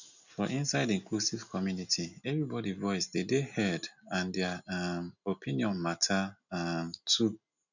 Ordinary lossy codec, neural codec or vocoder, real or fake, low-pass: none; none; real; 7.2 kHz